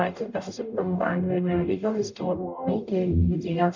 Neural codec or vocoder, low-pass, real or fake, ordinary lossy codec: codec, 44.1 kHz, 0.9 kbps, DAC; 7.2 kHz; fake; none